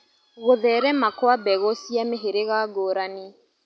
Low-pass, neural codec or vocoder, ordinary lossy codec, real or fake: none; none; none; real